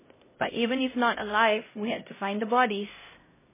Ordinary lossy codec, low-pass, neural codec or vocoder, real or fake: MP3, 16 kbps; 3.6 kHz; codec, 16 kHz, 0.5 kbps, X-Codec, HuBERT features, trained on LibriSpeech; fake